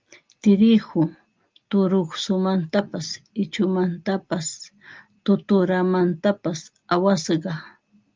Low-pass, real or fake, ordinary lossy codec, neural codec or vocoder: 7.2 kHz; real; Opus, 24 kbps; none